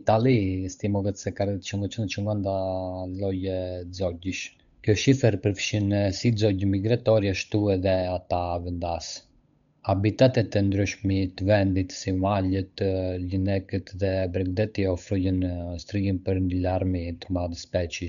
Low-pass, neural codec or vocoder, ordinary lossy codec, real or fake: 7.2 kHz; codec, 16 kHz, 8 kbps, FunCodec, trained on Chinese and English, 25 frames a second; none; fake